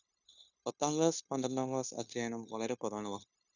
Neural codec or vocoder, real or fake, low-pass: codec, 16 kHz, 0.9 kbps, LongCat-Audio-Codec; fake; 7.2 kHz